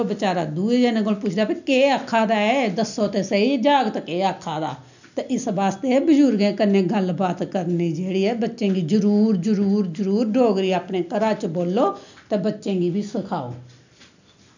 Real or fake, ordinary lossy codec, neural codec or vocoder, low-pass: real; none; none; 7.2 kHz